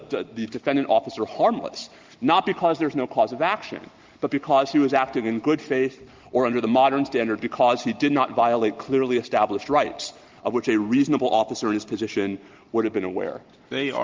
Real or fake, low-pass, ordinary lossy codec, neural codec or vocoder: real; 7.2 kHz; Opus, 32 kbps; none